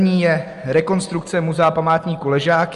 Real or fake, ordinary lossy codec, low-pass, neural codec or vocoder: real; Opus, 32 kbps; 10.8 kHz; none